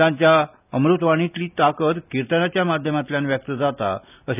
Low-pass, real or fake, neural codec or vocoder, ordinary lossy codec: 3.6 kHz; real; none; none